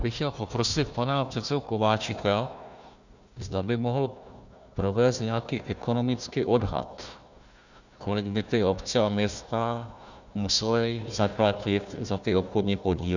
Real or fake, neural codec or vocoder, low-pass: fake; codec, 16 kHz, 1 kbps, FunCodec, trained on Chinese and English, 50 frames a second; 7.2 kHz